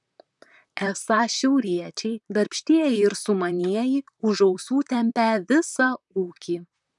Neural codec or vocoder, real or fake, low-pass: vocoder, 44.1 kHz, 128 mel bands, Pupu-Vocoder; fake; 10.8 kHz